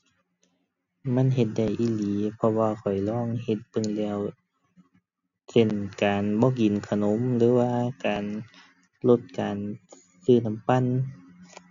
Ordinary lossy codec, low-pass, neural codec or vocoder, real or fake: none; 7.2 kHz; none; real